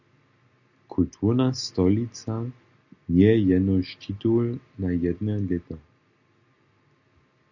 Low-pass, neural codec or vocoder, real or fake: 7.2 kHz; none; real